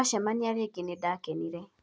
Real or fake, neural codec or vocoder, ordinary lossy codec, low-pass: real; none; none; none